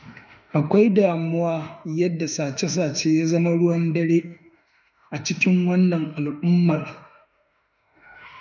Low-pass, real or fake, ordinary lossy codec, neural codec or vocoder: 7.2 kHz; fake; none; autoencoder, 48 kHz, 32 numbers a frame, DAC-VAE, trained on Japanese speech